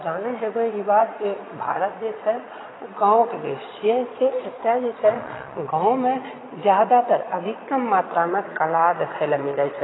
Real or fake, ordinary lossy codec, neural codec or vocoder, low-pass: fake; AAC, 16 kbps; vocoder, 22.05 kHz, 80 mel bands, Vocos; 7.2 kHz